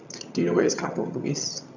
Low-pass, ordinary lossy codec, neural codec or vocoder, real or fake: 7.2 kHz; none; vocoder, 22.05 kHz, 80 mel bands, HiFi-GAN; fake